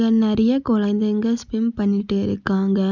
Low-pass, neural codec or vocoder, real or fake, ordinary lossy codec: 7.2 kHz; none; real; none